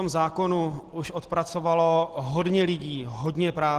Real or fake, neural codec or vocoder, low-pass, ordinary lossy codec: real; none; 14.4 kHz; Opus, 16 kbps